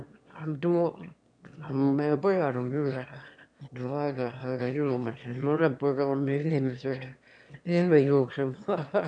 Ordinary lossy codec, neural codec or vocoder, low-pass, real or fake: none; autoencoder, 22.05 kHz, a latent of 192 numbers a frame, VITS, trained on one speaker; 9.9 kHz; fake